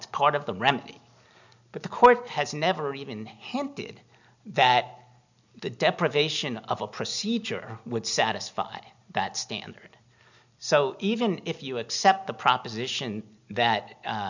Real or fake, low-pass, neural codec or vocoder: real; 7.2 kHz; none